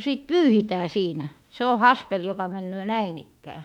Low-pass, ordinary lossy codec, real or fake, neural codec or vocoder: 19.8 kHz; none; fake; autoencoder, 48 kHz, 32 numbers a frame, DAC-VAE, trained on Japanese speech